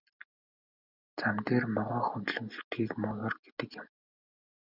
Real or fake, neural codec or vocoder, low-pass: real; none; 5.4 kHz